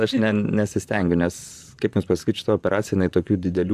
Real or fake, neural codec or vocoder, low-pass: fake; vocoder, 44.1 kHz, 128 mel bands, Pupu-Vocoder; 14.4 kHz